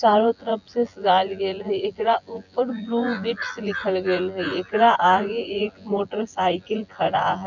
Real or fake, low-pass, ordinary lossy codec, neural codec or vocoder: fake; 7.2 kHz; none; vocoder, 24 kHz, 100 mel bands, Vocos